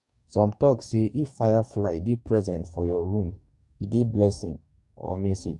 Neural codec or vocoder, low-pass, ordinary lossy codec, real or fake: codec, 44.1 kHz, 2.6 kbps, DAC; 10.8 kHz; none; fake